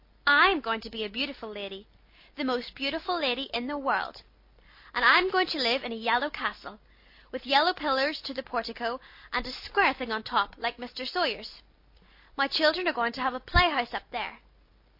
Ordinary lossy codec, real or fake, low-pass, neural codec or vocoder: MP3, 32 kbps; real; 5.4 kHz; none